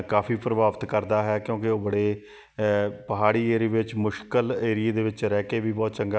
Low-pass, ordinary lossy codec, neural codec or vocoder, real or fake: none; none; none; real